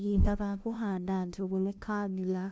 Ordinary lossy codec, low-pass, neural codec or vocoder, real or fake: none; none; codec, 16 kHz, 0.5 kbps, FunCodec, trained on LibriTTS, 25 frames a second; fake